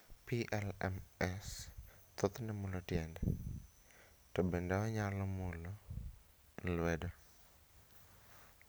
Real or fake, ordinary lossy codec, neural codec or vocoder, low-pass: real; none; none; none